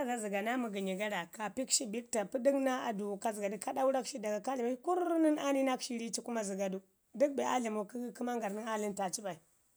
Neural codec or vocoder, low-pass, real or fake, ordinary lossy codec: none; none; real; none